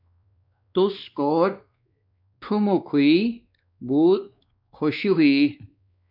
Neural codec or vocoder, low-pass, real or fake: codec, 16 kHz, 2 kbps, X-Codec, WavLM features, trained on Multilingual LibriSpeech; 5.4 kHz; fake